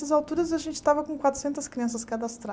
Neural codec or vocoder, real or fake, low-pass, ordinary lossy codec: none; real; none; none